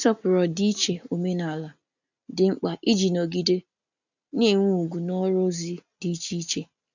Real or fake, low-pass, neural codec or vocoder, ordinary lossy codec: real; 7.2 kHz; none; none